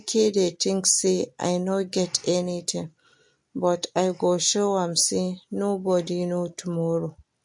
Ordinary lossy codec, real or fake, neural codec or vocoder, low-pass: MP3, 48 kbps; real; none; 10.8 kHz